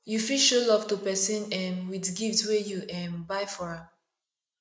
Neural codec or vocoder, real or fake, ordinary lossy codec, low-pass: none; real; none; none